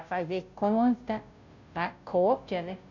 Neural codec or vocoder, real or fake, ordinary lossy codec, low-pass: codec, 16 kHz, 0.5 kbps, FunCodec, trained on Chinese and English, 25 frames a second; fake; none; 7.2 kHz